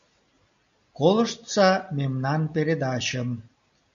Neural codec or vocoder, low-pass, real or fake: none; 7.2 kHz; real